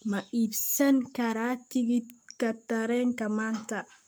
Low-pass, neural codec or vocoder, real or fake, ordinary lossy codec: none; codec, 44.1 kHz, 7.8 kbps, Pupu-Codec; fake; none